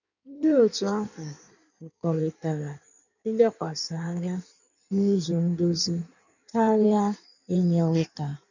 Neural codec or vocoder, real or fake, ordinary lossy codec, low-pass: codec, 16 kHz in and 24 kHz out, 1.1 kbps, FireRedTTS-2 codec; fake; none; 7.2 kHz